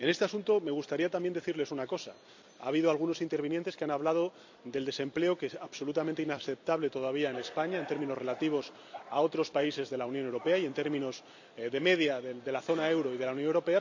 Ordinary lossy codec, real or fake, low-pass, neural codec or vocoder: AAC, 48 kbps; fake; 7.2 kHz; vocoder, 44.1 kHz, 128 mel bands every 256 samples, BigVGAN v2